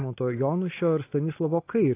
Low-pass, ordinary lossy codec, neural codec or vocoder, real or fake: 3.6 kHz; AAC, 24 kbps; none; real